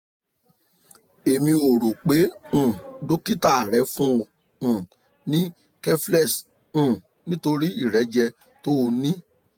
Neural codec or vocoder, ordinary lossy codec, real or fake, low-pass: vocoder, 48 kHz, 128 mel bands, Vocos; none; fake; none